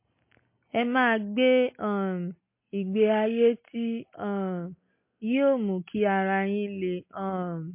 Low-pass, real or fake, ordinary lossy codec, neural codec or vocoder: 3.6 kHz; real; MP3, 24 kbps; none